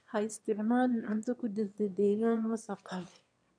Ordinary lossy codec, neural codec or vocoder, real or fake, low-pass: none; autoencoder, 22.05 kHz, a latent of 192 numbers a frame, VITS, trained on one speaker; fake; 9.9 kHz